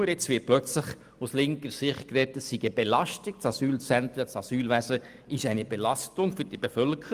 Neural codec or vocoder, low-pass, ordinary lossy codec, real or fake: none; 14.4 kHz; Opus, 32 kbps; real